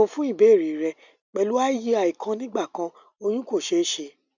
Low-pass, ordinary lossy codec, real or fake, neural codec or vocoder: 7.2 kHz; none; real; none